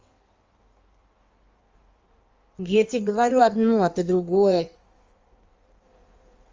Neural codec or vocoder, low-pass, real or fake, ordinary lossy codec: codec, 16 kHz in and 24 kHz out, 1.1 kbps, FireRedTTS-2 codec; 7.2 kHz; fake; Opus, 32 kbps